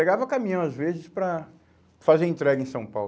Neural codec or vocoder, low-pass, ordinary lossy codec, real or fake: none; none; none; real